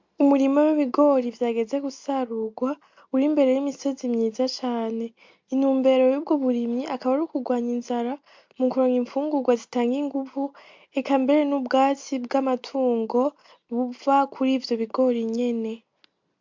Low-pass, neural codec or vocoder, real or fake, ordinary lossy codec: 7.2 kHz; none; real; MP3, 64 kbps